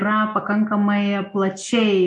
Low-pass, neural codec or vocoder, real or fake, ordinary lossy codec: 10.8 kHz; none; real; MP3, 48 kbps